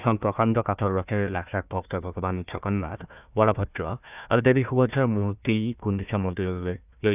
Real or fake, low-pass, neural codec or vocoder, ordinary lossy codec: fake; 3.6 kHz; codec, 16 kHz, 1 kbps, FunCodec, trained on Chinese and English, 50 frames a second; none